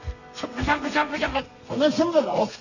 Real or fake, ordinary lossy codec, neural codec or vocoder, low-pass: fake; none; codec, 32 kHz, 1.9 kbps, SNAC; 7.2 kHz